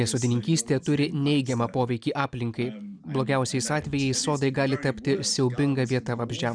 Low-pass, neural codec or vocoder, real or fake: 9.9 kHz; none; real